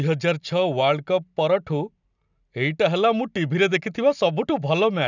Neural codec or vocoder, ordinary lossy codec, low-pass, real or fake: none; none; 7.2 kHz; real